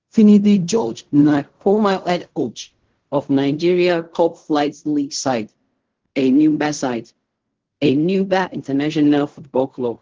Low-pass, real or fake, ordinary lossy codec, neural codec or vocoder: 7.2 kHz; fake; Opus, 16 kbps; codec, 16 kHz in and 24 kHz out, 0.4 kbps, LongCat-Audio-Codec, fine tuned four codebook decoder